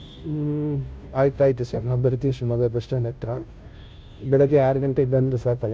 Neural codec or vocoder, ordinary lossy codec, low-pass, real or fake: codec, 16 kHz, 0.5 kbps, FunCodec, trained on Chinese and English, 25 frames a second; none; none; fake